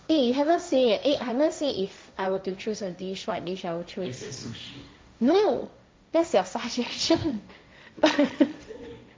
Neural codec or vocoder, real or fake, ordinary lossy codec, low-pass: codec, 16 kHz, 1.1 kbps, Voila-Tokenizer; fake; none; none